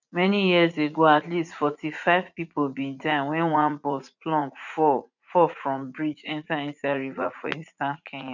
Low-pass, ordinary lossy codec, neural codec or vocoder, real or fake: 7.2 kHz; none; vocoder, 44.1 kHz, 80 mel bands, Vocos; fake